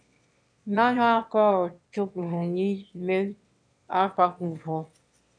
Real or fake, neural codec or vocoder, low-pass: fake; autoencoder, 22.05 kHz, a latent of 192 numbers a frame, VITS, trained on one speaker; 9.9 kHz